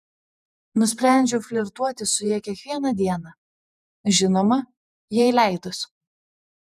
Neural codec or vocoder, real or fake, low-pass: vocoder, 48 kHz, 128 mel bands, Vocos; fake; 14.4 kHz